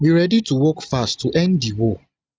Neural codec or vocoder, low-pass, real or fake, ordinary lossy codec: none; none; real; none